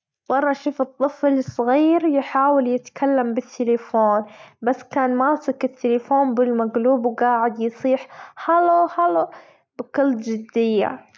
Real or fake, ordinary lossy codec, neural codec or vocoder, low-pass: real; none; none; none